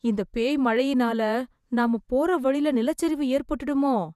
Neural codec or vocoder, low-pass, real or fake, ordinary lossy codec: vocoder, 48 kHz, 128 mel bands, Vocos; 14.4 kHz; fake; none